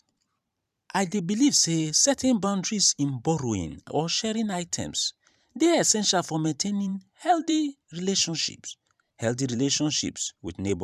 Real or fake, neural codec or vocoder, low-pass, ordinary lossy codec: fake; vocoder, 44.1 kHz, 128 mel bands every 512 samples, BigVGAN v2; 14.4 kHz; none